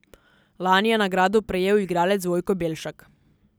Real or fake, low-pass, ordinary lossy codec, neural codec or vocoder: real; none; none; none